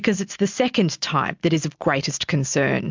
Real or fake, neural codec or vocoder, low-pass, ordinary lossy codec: real; none; 7.2 kHz; MP3, 64 kbps